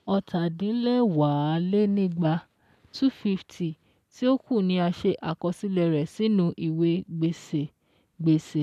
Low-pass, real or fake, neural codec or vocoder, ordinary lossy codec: 14.4 kHz; real; none; MP3, 96 kbps